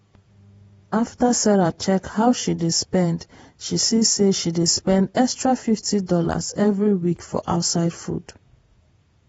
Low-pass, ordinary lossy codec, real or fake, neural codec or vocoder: 19.8 kHz; AAC, 24 kbps; fake; vocoder, 48 kHz, 128 mel bands, Vocos